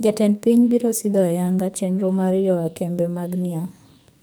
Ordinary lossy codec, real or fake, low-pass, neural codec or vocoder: none; fake; none; codec, 44.1 kHz, 2.6 kbps, SNAC